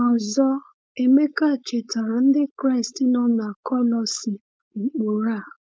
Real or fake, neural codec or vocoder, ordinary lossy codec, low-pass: fake; codec, 16 kHz, 4.8 kbps, FACodec; none; none